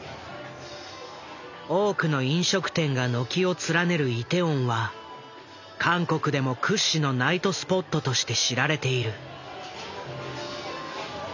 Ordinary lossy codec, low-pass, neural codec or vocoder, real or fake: none; 7.2 kHz; none; real